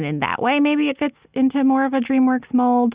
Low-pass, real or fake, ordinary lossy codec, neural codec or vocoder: 3.6 kHz; real; Opus, 64 kbps; none